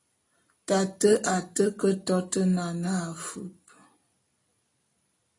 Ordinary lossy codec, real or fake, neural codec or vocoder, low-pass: AAC, 32 kbps; real; none; 10.8 kHz